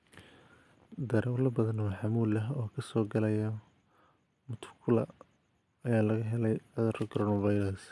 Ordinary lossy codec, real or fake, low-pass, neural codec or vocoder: none; real; none; none